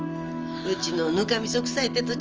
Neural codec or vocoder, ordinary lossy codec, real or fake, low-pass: none; Opus, 24 kbps; real; 7.2 kHz